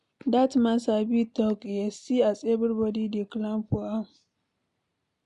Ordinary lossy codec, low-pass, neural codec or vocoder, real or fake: AAC, 96 kbps; 10.8 kHz; none; real